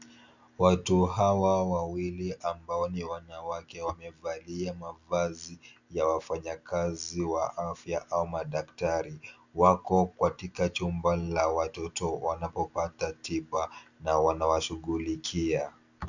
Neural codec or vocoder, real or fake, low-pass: none; real; 7.2 kHz